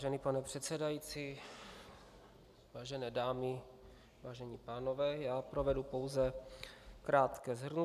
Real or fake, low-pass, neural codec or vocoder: real; 14.4 kHz; none